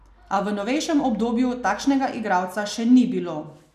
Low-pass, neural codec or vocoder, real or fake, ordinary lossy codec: 14.4 kHz; none; real; none